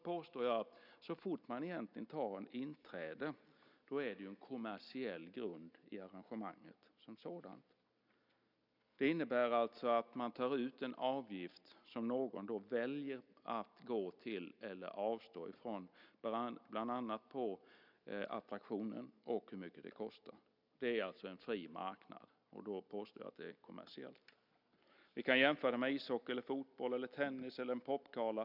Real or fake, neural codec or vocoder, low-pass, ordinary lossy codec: real; none; 5.4 kHz; none